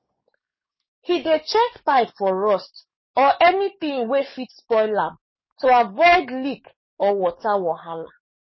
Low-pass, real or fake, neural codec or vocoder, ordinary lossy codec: 7.2 kHz; fake; codec, 44.1 kHz, 7.8 kbps, DAC; MP3, 24 kbps